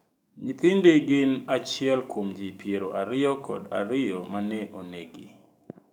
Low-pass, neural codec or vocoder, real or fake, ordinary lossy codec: 19.8 kHz; codec, 44.1 kHz, 7.8 kbps, DAC; fake; none